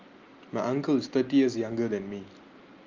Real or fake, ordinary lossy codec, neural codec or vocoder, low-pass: real; Opus, 32 kbps; none; 7.2 kHz